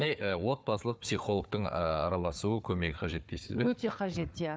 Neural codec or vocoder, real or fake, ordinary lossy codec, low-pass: codec, 16 kHz, 4 kbps, FunCodec, trained on Chinese and English, 50 frames a second; fake; none; none